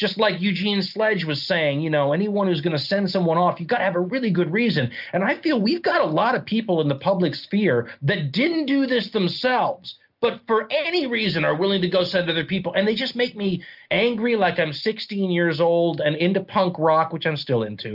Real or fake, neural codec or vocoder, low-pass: real; none; 5.4 kHz